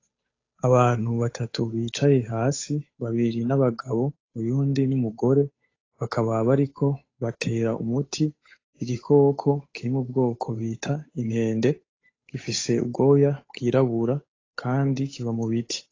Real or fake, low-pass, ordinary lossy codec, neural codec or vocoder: fake; 7.2 kHz; AAC, 32 kbps; codec, 16 kHz, 8 kbps, FunCodec, trained on Chinese and English, 25 frames a second